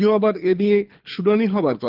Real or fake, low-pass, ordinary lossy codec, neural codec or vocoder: fake; 5.4 kHz; Opus, 32 kbps; codec, 24 kHz, 6 kbps, HILCodec